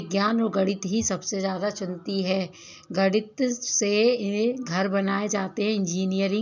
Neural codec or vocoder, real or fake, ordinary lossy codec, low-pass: none; real; none; 7.2 kHz